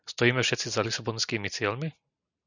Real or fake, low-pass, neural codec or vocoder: real; 7.2 kHz; none